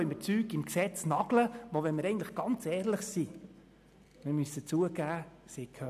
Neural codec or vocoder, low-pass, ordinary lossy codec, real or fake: none; 14.4 kHz; none; real